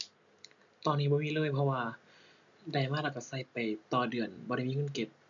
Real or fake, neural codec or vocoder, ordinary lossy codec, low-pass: real; none; none; 7.2 kHz